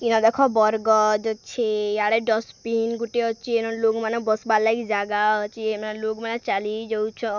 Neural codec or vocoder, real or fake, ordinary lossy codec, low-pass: none; real; none; 7.2 kHz